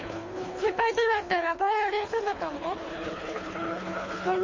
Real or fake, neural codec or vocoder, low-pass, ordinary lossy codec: fake; codec, 24 kHz, 3 kbps, HILCodec; 7.2 kHz; MP3, 32 kbps